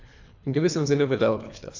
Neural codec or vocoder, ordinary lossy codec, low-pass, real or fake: codec, 24 kHz, 3 kbps, HILCodec; AAC, 48 kbps; 7.2 kHz; fake